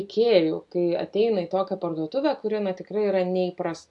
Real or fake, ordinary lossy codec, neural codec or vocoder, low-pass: real; AAC, 64 kbps; none; 10.8 kHz